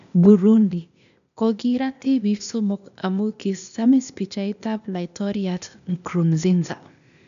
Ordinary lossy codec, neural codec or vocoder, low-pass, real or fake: none; codec, 16 kHz, 0.8 kbps, ZipCodec; 7.2 kHz; fake